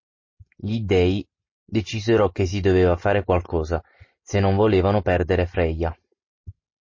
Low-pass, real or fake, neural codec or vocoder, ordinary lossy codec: 7.2 kHz; real; none; MP3, 32 kbps